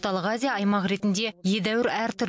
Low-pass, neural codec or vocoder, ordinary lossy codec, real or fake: none; none; none; real